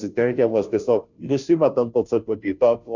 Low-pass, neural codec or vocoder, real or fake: 7.2 kHz; codec, 16 kHz, 0.5 kbps, FunCodec, trained on Chinese and English, 25 frames a second; fake